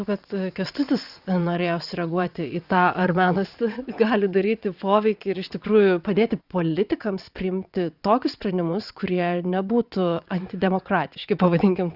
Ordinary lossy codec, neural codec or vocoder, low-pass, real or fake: Opus, 64 kbps; vocoder, 22.05 kHz, 80 mel bands, WaveNeXt; 5.4 kHz; fake